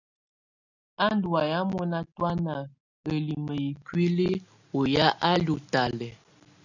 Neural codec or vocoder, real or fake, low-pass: none; real; 7.2 kHz